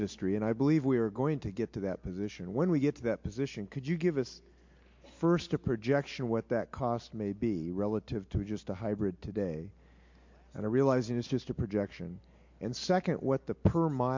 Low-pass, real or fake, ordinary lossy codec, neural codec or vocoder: 7.2 kHz; real; MP3, 48 kbps; none